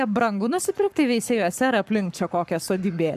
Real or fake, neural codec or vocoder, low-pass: fake; codec, 44.1 kHz, 7.8 kbps, Pupu-Codec; 14.4 kHz